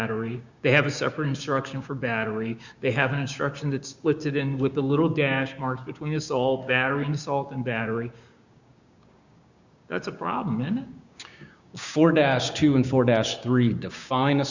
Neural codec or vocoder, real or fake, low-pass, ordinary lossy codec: codec, 16 kHz, 6 kbps, DAC; fake; 7.2 kHz; Opus, 64 kbps